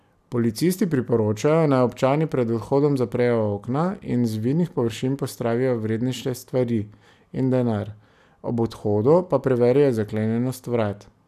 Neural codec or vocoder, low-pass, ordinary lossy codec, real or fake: none; 14.4 kHz; none; real